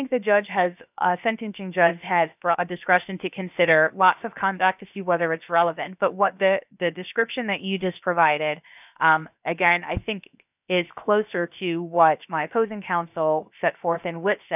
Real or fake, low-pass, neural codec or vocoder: fake; 3.6 kHz; codec, 16 kHz, 0.7 kbps, FocalCodec